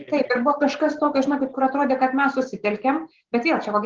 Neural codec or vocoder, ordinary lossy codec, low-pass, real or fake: none; Opus, 16 kbps; 7.2 kHz; real